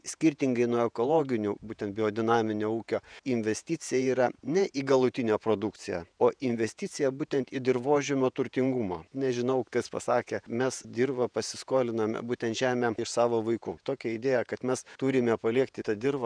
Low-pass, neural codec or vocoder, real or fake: 9.9 kHz; vocoder, 48 kHz, 128 mel bands, Vocos; fake